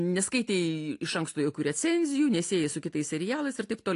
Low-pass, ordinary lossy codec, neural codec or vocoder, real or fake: 10.8 kHz; AAC, 48 kbps; none; real